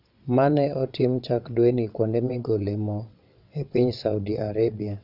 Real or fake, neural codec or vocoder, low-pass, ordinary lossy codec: fake; vocoder, 22.05 kHz, 80 mel bands, WaveNeXt; 5.4 kHz; none